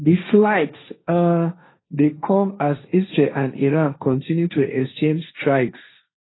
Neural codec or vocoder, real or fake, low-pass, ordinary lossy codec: codec, 16 kHz, 1.1 kbps, Voila-Tokenizer; fake; 7.2 kHz; AAC, 16 kbps